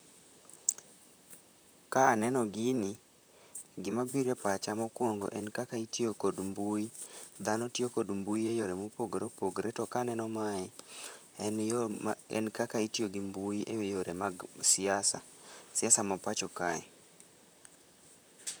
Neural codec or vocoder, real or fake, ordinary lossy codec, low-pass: vocoder, 44.1 kHz, 128 mel bands, Pupu-Vocoder; fake; none; none